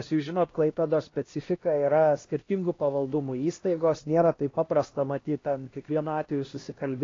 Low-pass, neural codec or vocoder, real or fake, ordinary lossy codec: 7.2 kHz; codec, 16 kHz, 1 kbps, X-Codec, HuBERT features, trained on LibriSpeech; fake; AAC, 32 kbps